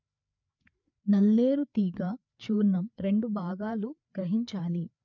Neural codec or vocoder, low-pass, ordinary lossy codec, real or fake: codec, 16 kHz, 4 kbps, FreqCodec, larger model; 7.2 kHz; none; fake